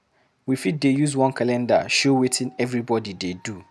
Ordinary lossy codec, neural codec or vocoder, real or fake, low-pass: none; none; real; none